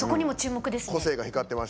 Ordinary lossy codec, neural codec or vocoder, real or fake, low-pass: none; none; real; none